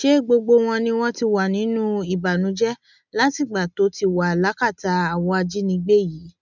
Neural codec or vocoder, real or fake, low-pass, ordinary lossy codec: none; real; 7.2 kHz; none